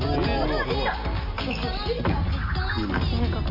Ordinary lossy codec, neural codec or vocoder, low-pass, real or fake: AAC, 48 kbps; none; 5.4 kHz; real